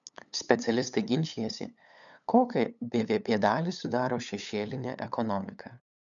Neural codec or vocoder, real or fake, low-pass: codec, 16 kHz, 8 kbps, FunCodec, trained on LibriTTS, 25 frames a second; fake; 7.2 kHz